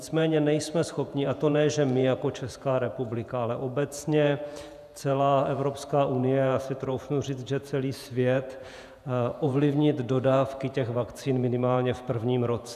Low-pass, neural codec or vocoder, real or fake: 14.4 kHz; vocoder, 48 kHz, 128 mel bands, Vocos; fake